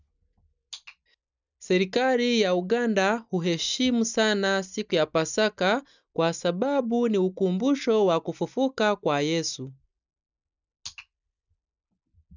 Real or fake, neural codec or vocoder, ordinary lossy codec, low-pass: real; none; none; 7.2 kHz